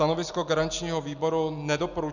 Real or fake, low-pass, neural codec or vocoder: real; 7.2 kHz; none